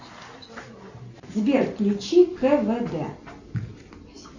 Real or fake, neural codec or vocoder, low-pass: real; none; 7.2 kHz